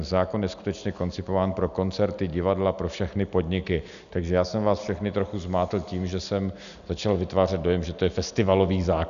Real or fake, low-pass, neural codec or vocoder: real; 7.2 kHz; none